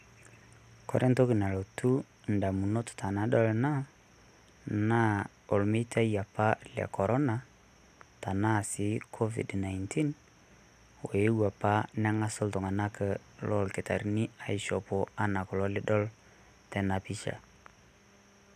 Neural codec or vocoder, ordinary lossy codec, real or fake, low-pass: none; none; real; 14.4 kHz